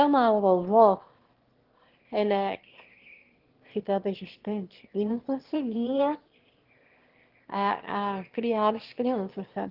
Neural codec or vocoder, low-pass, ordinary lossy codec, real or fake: autoencoder, 22.05 kHz, a latent of 192 numbers a frame, VITS, trained on one speaker; 5.4 kHz; Opus, 16 kbps; fake